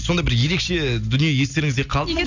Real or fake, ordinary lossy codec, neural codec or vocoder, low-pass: real; none; none; 7.2 kHz